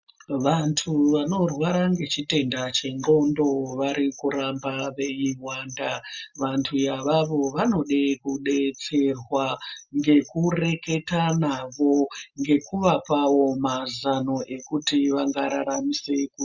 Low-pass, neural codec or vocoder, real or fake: 7.2 kHz; none; real